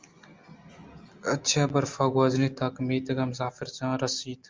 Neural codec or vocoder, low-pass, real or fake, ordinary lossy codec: none; 7.2 kHz; real; Opus, 32 kbps